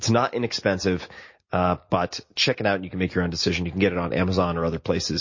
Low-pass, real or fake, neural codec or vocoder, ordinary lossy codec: 7.2 kHz; real; none; MP3, 32 kbps